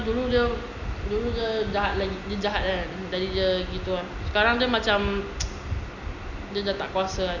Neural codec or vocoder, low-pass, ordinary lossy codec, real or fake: none; 7.2 kHz; none; real